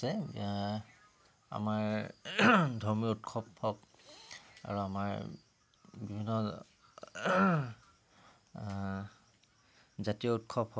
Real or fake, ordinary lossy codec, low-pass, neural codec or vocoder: real; none; none; none